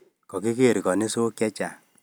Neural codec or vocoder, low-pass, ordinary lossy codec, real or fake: none; none; none; real